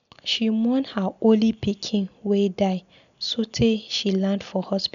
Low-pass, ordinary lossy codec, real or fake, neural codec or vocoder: 7.2 kHz; none; real; none